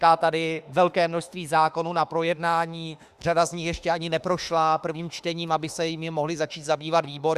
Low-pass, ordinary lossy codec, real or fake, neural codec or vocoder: 14.4 kHz; Opus, 64 kbps; fake; autoencoder, 48 kHz, 32 numbers a frame, DAC-VAE, trained on Japanese speech